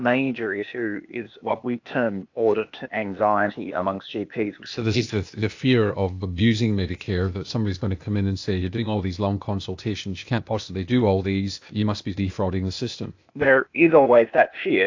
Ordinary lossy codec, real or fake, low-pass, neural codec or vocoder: AAC, 48 kbps; fake; 7.2 kHz; codec, 16 kHz, 0.8 kbps, ZipCodec